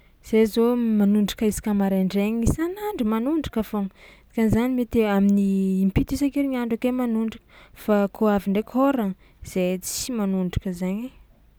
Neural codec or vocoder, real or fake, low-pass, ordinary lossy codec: none; real; none; none